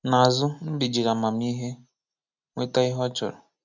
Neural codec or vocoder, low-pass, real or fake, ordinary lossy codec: none; 7.2 kHz; real; none